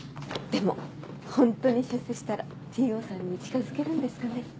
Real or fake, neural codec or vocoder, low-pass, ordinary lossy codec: real; none; none; none